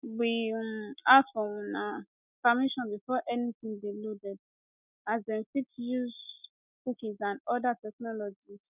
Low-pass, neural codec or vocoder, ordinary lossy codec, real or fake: 3.6 kHz; none; none; real